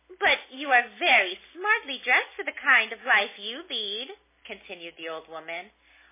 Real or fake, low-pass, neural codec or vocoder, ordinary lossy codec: real; 3.6 kHz; none; MP3, 16 kbps